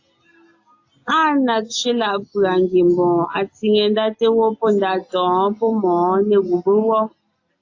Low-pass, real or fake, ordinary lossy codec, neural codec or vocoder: 7.2 kHz; real; AAC, 48 kbps; none